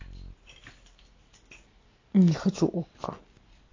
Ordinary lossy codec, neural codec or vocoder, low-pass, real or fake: AAC, 32 kbps; none; 7.2 kHz; real